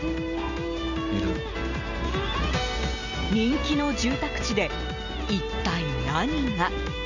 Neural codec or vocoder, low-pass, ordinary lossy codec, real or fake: none; 7.2 kHz; none; real